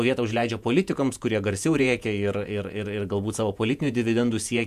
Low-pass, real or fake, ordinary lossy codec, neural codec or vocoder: 14.4 kHz; fake; MP3, 96 kbps; vocoder, 48 kHz, 128 mel bands, Vocos